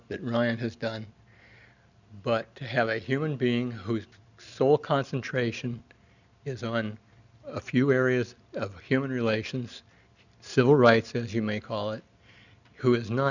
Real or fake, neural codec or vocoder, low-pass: real; none; 7.2 kHz